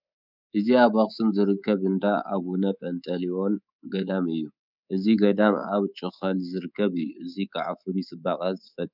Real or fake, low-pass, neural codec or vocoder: fake; 5.4 kHz; codec, 24 kHz, 3.1 kbps, DualCodec